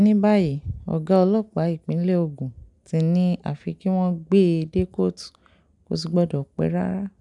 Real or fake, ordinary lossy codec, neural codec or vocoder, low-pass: real; none; none; 10.8 kHz